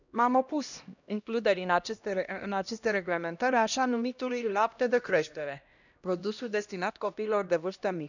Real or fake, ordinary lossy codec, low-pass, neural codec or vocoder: fake; none; 7.2 kHz; codec, 16 kHz, 1 kbps, X-Codec, HuBERT features, trained on LibriSpeech